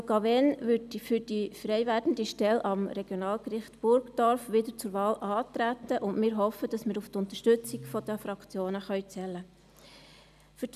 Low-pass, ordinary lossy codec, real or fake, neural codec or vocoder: 14.4 kHz; none; real; none